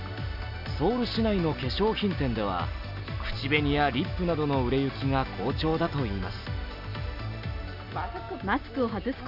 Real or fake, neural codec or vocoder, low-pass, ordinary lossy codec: real; none; 5.4 kHz; none